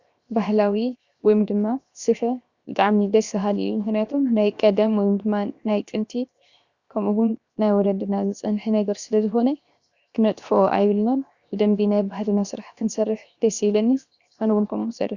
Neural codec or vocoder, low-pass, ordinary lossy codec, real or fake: codec, 16 kHz, 0.7 kbps, FocalCodec; 7.2 kHz; Opus, 64 kbps; fake